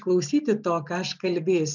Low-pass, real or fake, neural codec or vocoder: 7.2 kHz; real; none